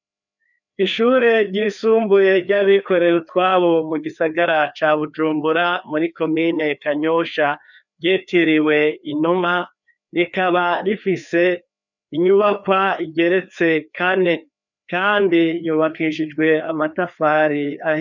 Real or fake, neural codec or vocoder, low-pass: fake; codec, 16 kHz, 2 kbps, FreqCodec, larger model; 7.2 kHz